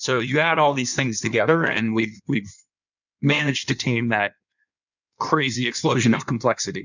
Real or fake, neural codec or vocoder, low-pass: fake; codec, 16 kHz in and 24 kHz out, 1.1 kbps, FireRedTTS-2 codec; 7.2 kHz